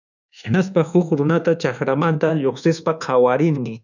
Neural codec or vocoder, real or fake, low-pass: codec, 24 kHz, 1.2 kbps, DualCodec; fake; 7.2 kHz